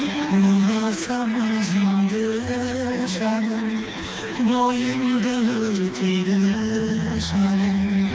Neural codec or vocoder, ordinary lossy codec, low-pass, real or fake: codec, 16 kHz, 2 kbps, FreqCodec, smaller model; none; none; fake